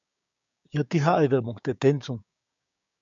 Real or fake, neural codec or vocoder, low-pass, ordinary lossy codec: fake; codec, 16 kHz, 6 kbps, DAC; 7.2 kHz; MP3, 96 kbps